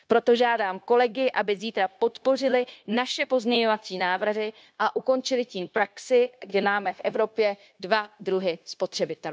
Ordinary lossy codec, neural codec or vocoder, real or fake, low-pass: none; codec, 16 kHz, 0.9 kbps, LongCat-Audio-Codec; fake; none